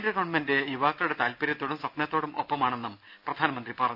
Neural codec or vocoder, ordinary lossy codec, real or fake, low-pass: none; AAC, 48 kbps; real; 5.4 kHz